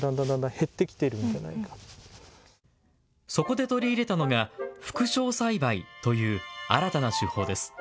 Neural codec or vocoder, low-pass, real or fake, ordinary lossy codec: none; none; real; none